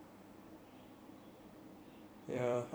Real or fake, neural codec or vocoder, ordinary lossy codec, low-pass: fake; vocoder, 44.1 kHz, 128 mel bands every 256 samples, BigVGAN v2; none; none